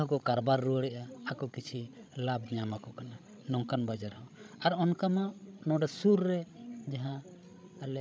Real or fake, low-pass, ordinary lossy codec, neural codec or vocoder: fake; none; none; codec, 16 kHz, 16 kbps, FreqCodec, larger model